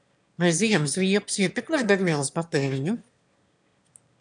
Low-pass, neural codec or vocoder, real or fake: 9.9 kHz; autoencoder, 22.05 kHz, a latent of 192 numbers a frame, VITS, trained on one speaker; fake